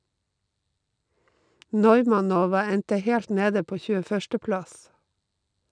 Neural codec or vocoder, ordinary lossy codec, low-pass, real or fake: none; none; 9.9 kHz; real